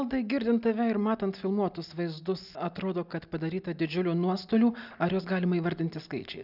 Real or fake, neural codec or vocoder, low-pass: real; none; 5.4 kHz